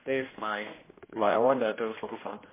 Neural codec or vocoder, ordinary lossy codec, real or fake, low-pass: codec, 16 kHz, 1 kbps, X-Codec, HuBERT features, trained on general audio; MP3, 16 kbps; fake; 3.6 kHz